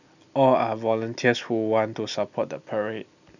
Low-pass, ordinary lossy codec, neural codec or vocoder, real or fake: 7.2 kHz; none; none; real